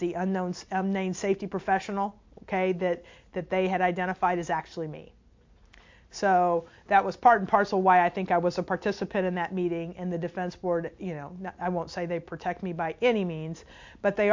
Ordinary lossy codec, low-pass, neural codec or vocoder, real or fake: MP3, 48 kbps; 7.2 kHz; none; real